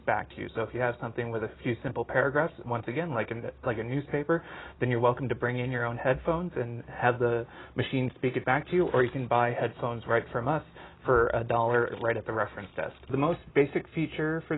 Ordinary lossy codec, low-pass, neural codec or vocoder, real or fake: AAC, 16 kbps; 7.2 kHz; none; real